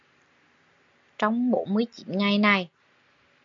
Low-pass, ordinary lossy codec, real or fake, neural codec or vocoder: 7.2 kHz; MP3, 48 kbps; real; none